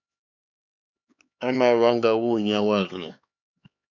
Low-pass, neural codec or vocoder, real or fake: 7.2 kHz; codec, 16 kHz, 4 kbps, X-Codec, HuBERT features, trained on LibriSpeech; fake